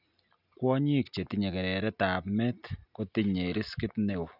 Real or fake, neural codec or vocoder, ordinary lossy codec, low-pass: real; none; none; 5.4 kHz